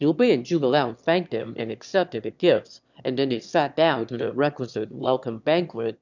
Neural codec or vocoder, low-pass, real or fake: autoencoder, 22.05 kHz, a latent of 192 numbers a frame, VITS, trained on one speaker; 7.2 kHz; fake